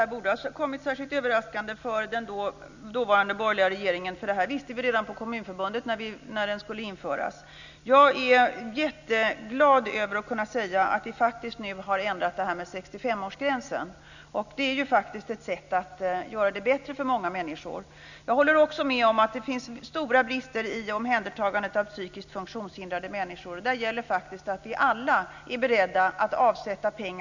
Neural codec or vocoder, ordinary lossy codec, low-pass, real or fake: none; none; 7.2 kHz; real